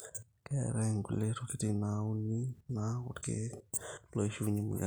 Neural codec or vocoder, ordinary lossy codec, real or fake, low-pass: none; none; real; none